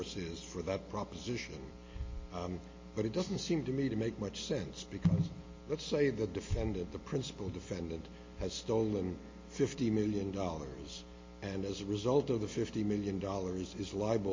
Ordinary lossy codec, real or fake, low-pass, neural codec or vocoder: MP3, 32 kbps; real; 7.2 kHz; none